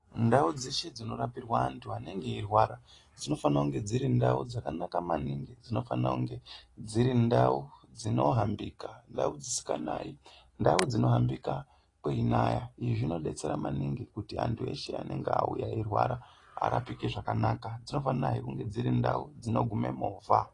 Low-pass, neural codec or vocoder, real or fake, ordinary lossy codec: 10.8 kHz; none; real; AAC, 32 kbps